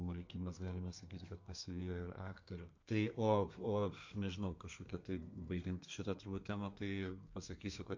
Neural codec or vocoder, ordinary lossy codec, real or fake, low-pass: codec, 32 kHz, 1.9 kbps, SNAC; MP3, 48 kbps; fake; 7.2 kHz